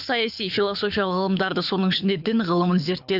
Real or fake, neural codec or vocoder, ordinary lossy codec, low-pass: fake; codec, 24 kHz, 6 kbps, HILCodec; none; 5.4 kHz